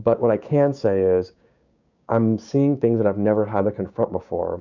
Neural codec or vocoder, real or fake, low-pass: codec, 16 kHz, 6 kbps, DAC; fake; 7.2 kHz